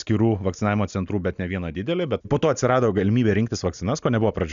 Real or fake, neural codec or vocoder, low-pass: real; none; 7.2 kHz